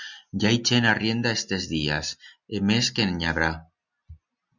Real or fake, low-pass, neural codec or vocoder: real; 7.2 kHz; none